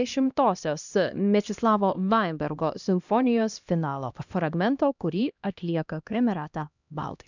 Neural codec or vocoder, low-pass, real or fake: codec, 16 kHz, 1 kbps, X-Codec, HuBERT features, trained on LibriSpeech; 7.2 kHz; fake